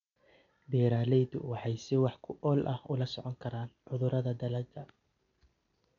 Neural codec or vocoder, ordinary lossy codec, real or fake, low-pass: none; none; real; 7.2 kHz